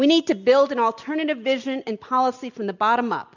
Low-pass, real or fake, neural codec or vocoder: 7.2 kHz; real; none